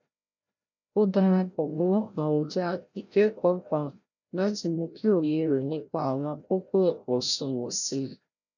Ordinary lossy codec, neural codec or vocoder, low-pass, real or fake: none; codec, 16 kHz, 0.5 kbps, FreqCodec, larger model; 7.2 kHz; fake